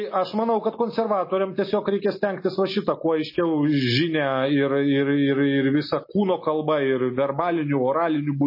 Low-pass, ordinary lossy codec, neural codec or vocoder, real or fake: 5.4 kHz; MP3, 24 kbps; none; real